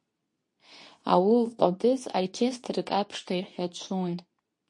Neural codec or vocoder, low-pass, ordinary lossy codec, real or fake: codec, 24 kHz, 0.9 kbps, WavTokenizer, medium speech release version 2; 10.8 kHz; MP3, 48 kbps; fake